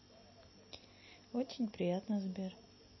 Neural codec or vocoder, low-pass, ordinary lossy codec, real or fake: none; 7.2 kHz; MP3, 24 kbps; real